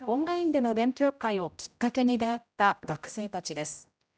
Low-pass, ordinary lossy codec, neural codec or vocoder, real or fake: none; none; codec, 16 kHz, 0.5 kbps, X-Codec, HuBERT features, trained on general audio; fake